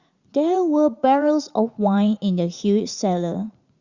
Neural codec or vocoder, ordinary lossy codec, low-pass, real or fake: vocoder, 44.1 kHz, 80 mel bands, Vocos; Opus, 64 kbps; 7.2 kHz; fake